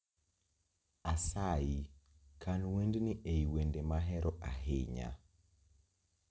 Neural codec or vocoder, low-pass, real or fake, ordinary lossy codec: none; none; real; none